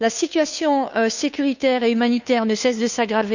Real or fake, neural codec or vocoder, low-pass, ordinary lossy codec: fake; codec, 16 kHz, 2 kbps, FunCodec, trained on Chinese and English, 25 frames a second; 7.2 kHz; none